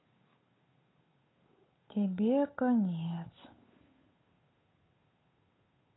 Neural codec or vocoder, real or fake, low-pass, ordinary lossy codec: codec, 16 kHz, 8 kbps, FunCodec, trained on Chinese and English, 25 frames a second; fake; 7.2 kHz; AAC, 16 kbps